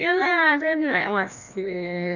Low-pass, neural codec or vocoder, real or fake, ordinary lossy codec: 7.2 kHz; codec, 16 kHz, 1 kbps, FreqCodec, larger model; fake; none